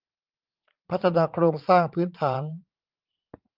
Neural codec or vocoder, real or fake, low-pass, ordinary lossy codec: vocoder, 44.1 kHz, 80 mel bands, Vocos; fake; 5.4 kHz; Opus, 32 kbps